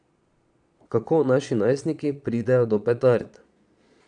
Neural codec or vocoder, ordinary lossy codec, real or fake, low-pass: vocoder, 22.05 kHz, 80 mel bands, Vocos; none; fake; 9.9 kHz